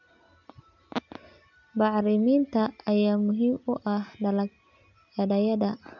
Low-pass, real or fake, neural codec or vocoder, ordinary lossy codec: 7.2 kHz; real; none; none